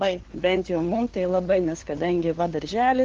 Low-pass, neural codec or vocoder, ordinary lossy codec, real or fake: 7.2 kHz; codec, 16 kHz, 2 kbps, FunCodec, trained on LibriTTS, 25 frames a second; Opus, 16 kbps; fake